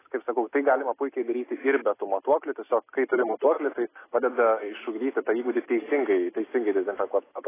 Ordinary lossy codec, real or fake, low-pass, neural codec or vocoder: AAC, 16 kbps; real; 3.6 kHz; none